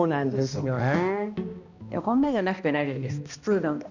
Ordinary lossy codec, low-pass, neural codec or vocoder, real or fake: none; 7.2 kHz; codec, 16 kHz, 1 kbps, X-Codec, HuBERT features, trained on balanced general audio; fake